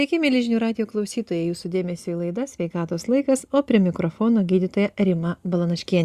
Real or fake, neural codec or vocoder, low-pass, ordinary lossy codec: real; none; 14.4 kHz; Opus, 64 kbps